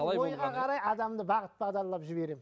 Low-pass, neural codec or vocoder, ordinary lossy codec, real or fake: none; none; none; real